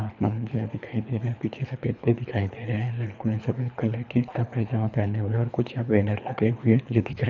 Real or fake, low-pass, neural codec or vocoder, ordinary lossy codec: fake; 7.2 kHz; codec, 24 kHz, 3 kbps, HILCodec; none